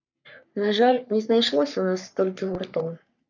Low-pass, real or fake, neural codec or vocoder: 7.2 kHz; fake; codec, 44.1 kHz, 3.4 kbps, Pupu-Codec